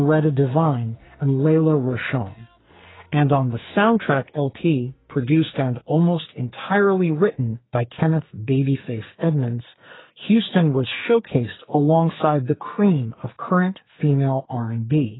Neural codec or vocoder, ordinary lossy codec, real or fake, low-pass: codec, 44.1 kHz, 2.6 kbps, SNAC; AAC, 16 kbps; fake; 7.2 kHz